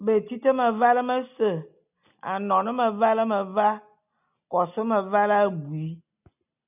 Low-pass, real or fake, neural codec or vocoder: 3.6 kHz; real; none